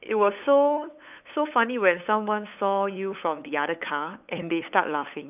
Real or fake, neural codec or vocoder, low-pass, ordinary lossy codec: fake; codec, 16 kHz, 8 kbps, FunCodec, trained on LibriTTS, 25 frames a second; 3.6 kHz; none